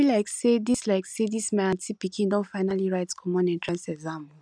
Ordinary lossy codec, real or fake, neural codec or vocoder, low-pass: none; real; none; 9.9 kHz